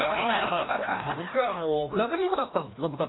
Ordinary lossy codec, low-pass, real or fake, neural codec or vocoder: AAC, 16 kbps; 7.2 kHz; fake; codec, 16 kHz, 1 kbps, FreqCodec, larger model